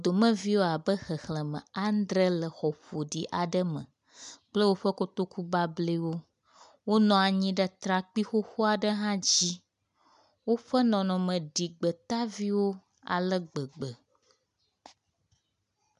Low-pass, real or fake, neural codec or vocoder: 10.8 kHz; real; none